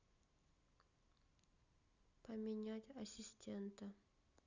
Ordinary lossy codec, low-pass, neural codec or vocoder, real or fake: none; 7.2 kHz; none; real